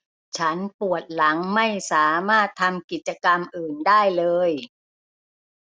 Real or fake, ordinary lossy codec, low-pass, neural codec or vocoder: real; none; none; none